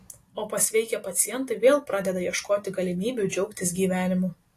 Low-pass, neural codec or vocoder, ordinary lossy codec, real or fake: 14.4 kHz; none; AAC, 48 kbps; real